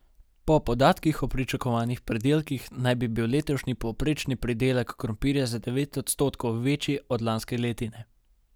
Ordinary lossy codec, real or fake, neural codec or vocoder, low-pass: none; real; none; none